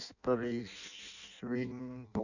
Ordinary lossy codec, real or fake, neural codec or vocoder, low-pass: none; fake; codec, 16 kHz in and 24 kHz out, 0.6 kbps, FireRedTTS-2 codec; 7.2 kHz